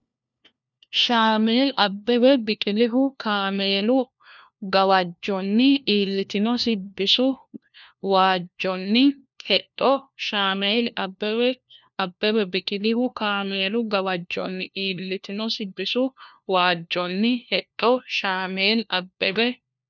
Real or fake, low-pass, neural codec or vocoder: fake; 7.2 kHz; codec, 16 kHz, 1 kbps, FunCodec, trained on LibriTTS, 50 frames a second